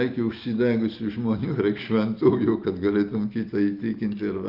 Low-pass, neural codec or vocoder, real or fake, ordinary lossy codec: 5.4 kHz; none; real; Opus, 24 kbps